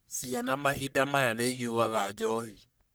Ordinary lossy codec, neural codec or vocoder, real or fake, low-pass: none; codec, 44.1 kHz, 1.7 kbps, Pupu-Codec; fake; none